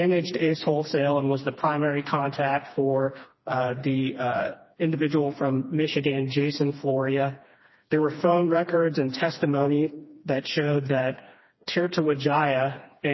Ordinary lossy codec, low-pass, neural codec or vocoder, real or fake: MP3, 24 kbps; 7.2 kHz; codec, 16 kHz, 2 kbps, FreqCodec, smaller model; fake